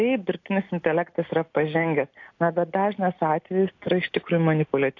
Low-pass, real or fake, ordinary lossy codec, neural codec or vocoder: 7.2 kHz; real; AAC, 48 kbps; none